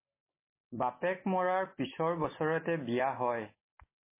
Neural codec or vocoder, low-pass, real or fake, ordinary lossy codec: none; 3.6 kHz; real; MP3, 16 kbps